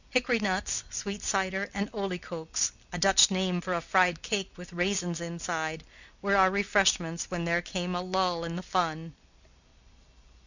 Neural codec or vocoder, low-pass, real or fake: none; 7.2 kHz; real